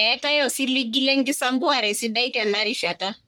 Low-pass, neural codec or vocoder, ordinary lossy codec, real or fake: none; codec, 44.1 kHz, 1.7 kbps, Pupu-Codec; none; fake